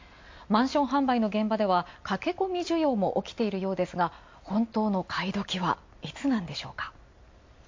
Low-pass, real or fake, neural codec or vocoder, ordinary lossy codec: 7.2 kHz; real; none; MP3, 48 kbps